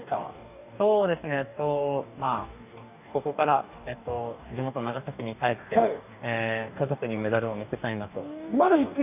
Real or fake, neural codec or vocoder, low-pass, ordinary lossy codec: fake; codec, 44.1 kHz, 2.6 kbps, DAC; 3.6 kHz; none